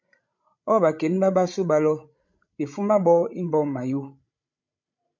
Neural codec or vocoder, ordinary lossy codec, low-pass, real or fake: codec, 16 kHz, 16 kbps, FreqCodec, larger model; MP3, 64 kbps; 7.2 kHz; fake